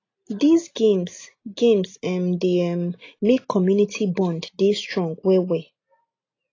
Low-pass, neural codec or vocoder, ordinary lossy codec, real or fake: 7.2 kHz; none; AAC, 32 kbps; real